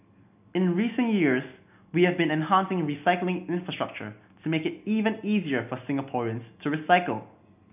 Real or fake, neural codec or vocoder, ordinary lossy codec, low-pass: real; none; none; 3.6 kHz